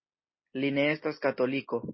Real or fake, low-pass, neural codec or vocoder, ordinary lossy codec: real; 7.2 kHz; none; MP3, 24 kbps